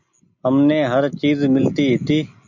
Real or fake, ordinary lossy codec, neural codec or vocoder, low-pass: real; MP3, 64 kbps; none; 7.2 kHz